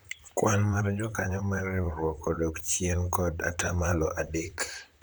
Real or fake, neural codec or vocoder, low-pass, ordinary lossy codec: fake; vocoder, 44.1 kHz, 128 mel bands, Pupu-Vocoder; none; none